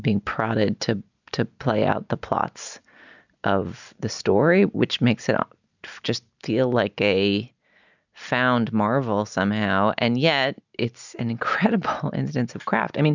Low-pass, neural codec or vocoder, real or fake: 7.2 kHz; none; real